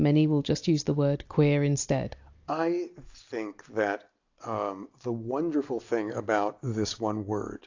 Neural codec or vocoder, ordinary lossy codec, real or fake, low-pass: none; AAC, 48 kbps; real; 7.2 kHz